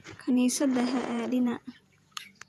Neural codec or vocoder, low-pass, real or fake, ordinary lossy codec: vocoder, 48 kHz, 128 mel bands, Vocos; 14.4 kHz; fake; none